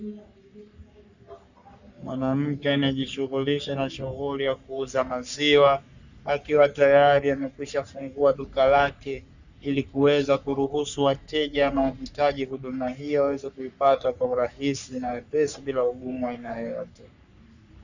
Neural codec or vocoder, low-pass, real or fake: codec, 44.1 kHz, 3.4 kbps, Pupu-Codec; 7.2 kHz; fake